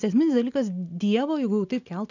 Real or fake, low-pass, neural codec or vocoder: real; 7.2 kHz; none